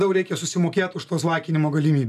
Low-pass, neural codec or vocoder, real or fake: 14.4 kHz; none; real